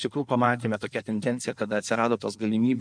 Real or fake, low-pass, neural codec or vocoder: fake; 9.9 kHz; codec, 16 kHz in and 24 kHz out, 2.2 kbps, FireRedTTS-2 codec